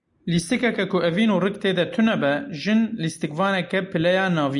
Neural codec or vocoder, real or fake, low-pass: none; real; 10.8 kHz